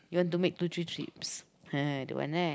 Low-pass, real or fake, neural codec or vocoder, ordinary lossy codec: none; real; none; none